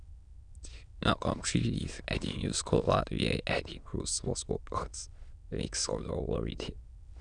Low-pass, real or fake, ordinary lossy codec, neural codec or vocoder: 9.9 kHz; fake; none; autoencoder, 22.05 kHz, a latent of 192 numbers a frame, VITS, trained on many speakers